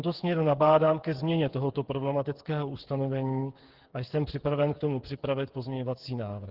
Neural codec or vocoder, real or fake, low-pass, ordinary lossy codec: codec, 16 kHz, 8 kbps, FreqCodec, smaller model; fake; 5.4 kHz; Opus, 16 kbps